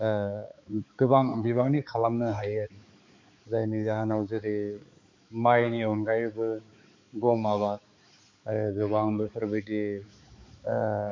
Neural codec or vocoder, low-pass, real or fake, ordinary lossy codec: codec, 16 kHz, 4 kbps, X-Codec, HuBERT features, trained on balanced general audio; 7.2 kHz; fake; MP3, 48 kbps